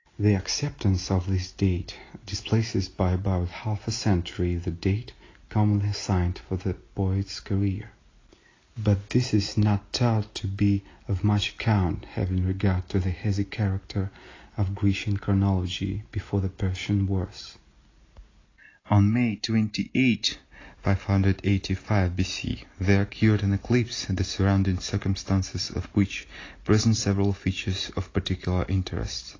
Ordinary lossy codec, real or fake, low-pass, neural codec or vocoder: AAC, 32 kbps; real; 7.2 kHz; none